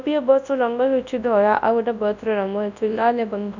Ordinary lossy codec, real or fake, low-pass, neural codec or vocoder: none; fake; 7.2 kHz; codec, 24 kHz, 0.9 kbps, WavTokenizer, large speech release